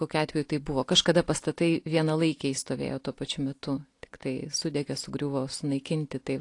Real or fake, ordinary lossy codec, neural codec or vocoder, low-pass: real; AAC, 48 kbps; none; 10.8 kHz